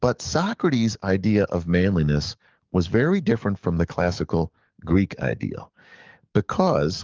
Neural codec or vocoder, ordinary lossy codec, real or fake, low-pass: codec, 44.1 kHz, 7.8 kbps, DAC; Opus, 32 kbps; fake; 7.2 kHz